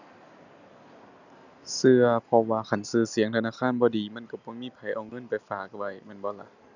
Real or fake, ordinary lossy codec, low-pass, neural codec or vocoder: real; none; 7.2 kHz; none